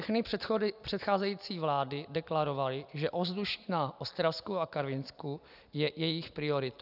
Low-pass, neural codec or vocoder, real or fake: 5.4 kHz; none; real